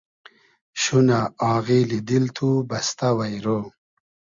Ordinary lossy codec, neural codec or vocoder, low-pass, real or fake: Opus, 64 kbps; none; 7.2 kHz; real